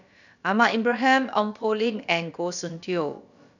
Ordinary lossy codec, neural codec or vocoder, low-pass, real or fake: none; codec, 16 kHz, about 1 kbps, DyCAST, with the encoder's durations; 7.2 kHz; fake